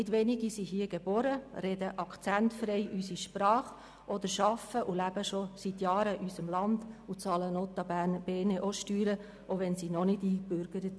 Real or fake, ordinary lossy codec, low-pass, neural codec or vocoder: real; none; none; none